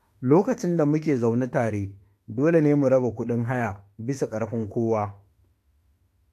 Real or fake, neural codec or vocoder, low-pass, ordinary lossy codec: fake; autoencoder, 48 kHz, 32 numbers a frame, DAC-VAE, trained on Japanese speech; 14.4 kHz; AAC, 64 kbps